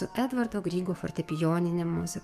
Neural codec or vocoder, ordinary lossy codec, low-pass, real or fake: codec, 44.1 kHz, 7.8 kbps, DAC; MP3, 96 kbps; 14.4 kHz; fake